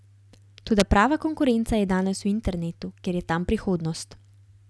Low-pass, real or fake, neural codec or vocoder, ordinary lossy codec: none; real; none; none